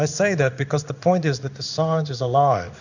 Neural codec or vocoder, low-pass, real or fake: codec, 16 kHz in and 24 kHz out, 1 kbps, XY-Tokenizer; 7.2 kHz; fake